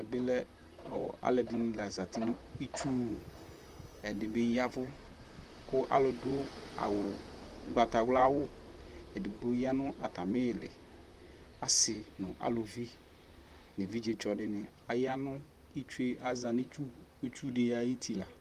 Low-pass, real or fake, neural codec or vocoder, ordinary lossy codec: 14.4 kHz; fake; vocoder, 44.1 kHz, 128 mel bands, Pupu-Vocoder; Opus, 32 kbps